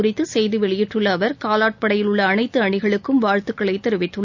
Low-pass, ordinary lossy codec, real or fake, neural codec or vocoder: 7.2 kHz; none; real; none